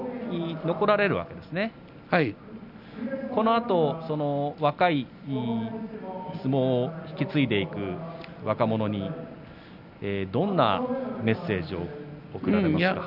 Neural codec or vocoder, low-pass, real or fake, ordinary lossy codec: none; 5.4 kHz; real; none